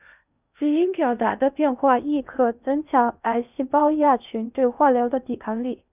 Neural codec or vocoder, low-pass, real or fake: codec, 16 kHz in and 24 kHz out, 0.6 kbps, FocalCodec, streaming, 2048 codes; 3.6 kHz; fake